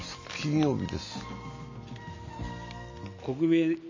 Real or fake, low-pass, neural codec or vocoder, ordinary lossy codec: real; 7.2 kHz; none; MP3, 32 kbps